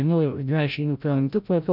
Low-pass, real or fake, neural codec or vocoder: 5.4 kHz; fake; codec, 16 kHz, 0.5 kbps, FreqCodec, larger model